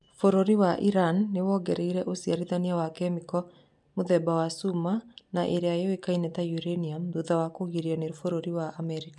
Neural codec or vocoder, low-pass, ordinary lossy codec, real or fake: none; 10.8 kHz; none; real